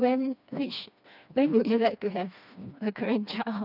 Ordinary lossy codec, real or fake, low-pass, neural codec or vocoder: none; fake; 5.4 kHz; codec, 16 kHz, 2 kbps, FreqCodec, smaller model